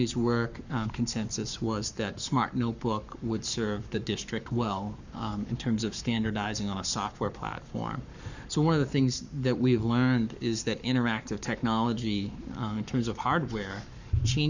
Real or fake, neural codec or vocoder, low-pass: fake; codec, 44.1 kHz, 7.8 kbps, Pupu-Codec; 7.2 kHz